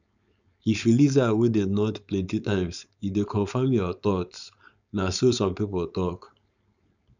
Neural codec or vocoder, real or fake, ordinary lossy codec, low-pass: codec, 16 kHz, 4.8 kbps, FACodec; fake; none; 7.2 kHz